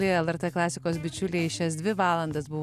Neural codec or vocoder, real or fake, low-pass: none; real; 14.4 kHz